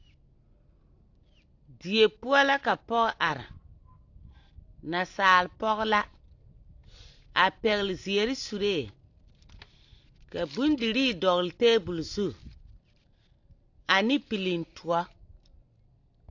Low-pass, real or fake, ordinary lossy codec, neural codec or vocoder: 7.2 kHz; real; AAC, 48 kbps; none